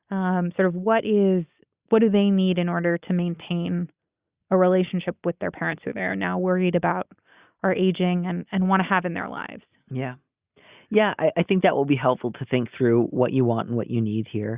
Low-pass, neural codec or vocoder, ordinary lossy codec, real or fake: 3.6 kHz; none; Opus, 64 kbps; real